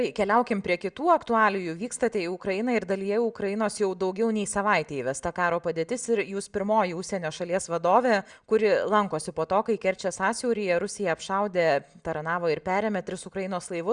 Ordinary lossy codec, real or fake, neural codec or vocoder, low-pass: Opus, 64 kbps; real; none; 9.9 kHz